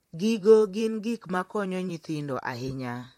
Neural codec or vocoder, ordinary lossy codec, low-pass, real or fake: vocoder, 44.1 kHz, 128 mel bands, Pupu-Vocoder; MP3, 64 kbps; 19.8 kHz; fake